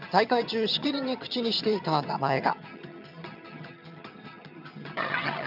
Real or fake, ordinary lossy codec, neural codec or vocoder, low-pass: fake; none; vocoder, 22.05 kHz, 80 mel bands, HiFi-GAN; 5.4 kHz